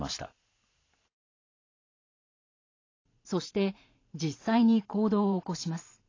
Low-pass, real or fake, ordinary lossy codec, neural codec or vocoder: 7.2 kHz; fake; AAC, 32 kbps; vocoder, 44.1 kHz, 128 mel bands every 512 samples, BigVGAN v2